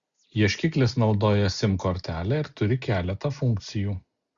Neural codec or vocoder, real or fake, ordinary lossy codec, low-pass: none; real; Opus, 64 kbps; 7.2 kHz